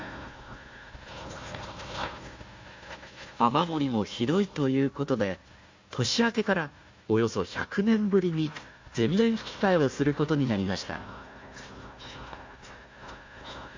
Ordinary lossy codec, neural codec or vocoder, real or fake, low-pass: MP3, 48 kbps; codec, 16 kHz, 1 kbps, FunCodec, trained on Chinese and English, 50 frames a second; fake; 7.2 kHz